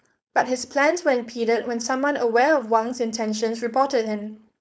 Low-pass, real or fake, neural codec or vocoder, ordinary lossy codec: none; fake; codec, 16 kHz, 4.8 kbps, FACodec; none